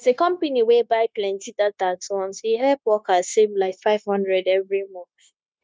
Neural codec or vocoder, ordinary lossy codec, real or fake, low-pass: codec, 16 kHz, 0.9 kbps, LongCat-Audio-Codec; none; fake; none